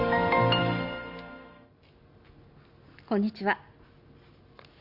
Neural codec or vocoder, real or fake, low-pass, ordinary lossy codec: none; real; 5.4 kHz; Opus, 64 kbps